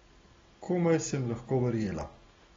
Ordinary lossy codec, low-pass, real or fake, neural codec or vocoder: AAC, 24 kbps; 7.2 kHz; real; none